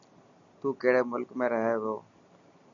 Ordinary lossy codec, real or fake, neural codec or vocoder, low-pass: MP3, 96 kbps; real; none; 7.2 kHz